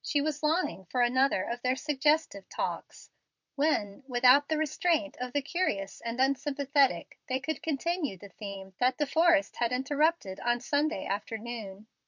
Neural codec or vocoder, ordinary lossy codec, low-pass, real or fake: vocoder, 44.1 kHz, 128 mel bands, Pupu-Vocoder; MP3, 64 kbps; 7.2 kHz; fake